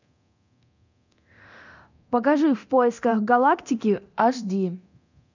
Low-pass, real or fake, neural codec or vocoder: 7.2 kHz; fake; codec, 24 kHz, 0.9 kbps, DualCodec